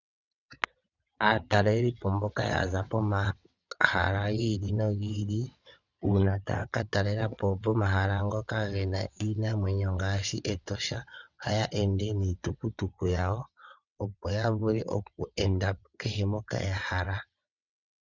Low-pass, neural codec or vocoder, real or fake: 7.2 kHz; vocoder, 22.05 kHz, 80 mel bands, WaveNeXt; fake